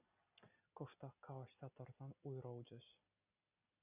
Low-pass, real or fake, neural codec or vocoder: 3.6 kHz; real; none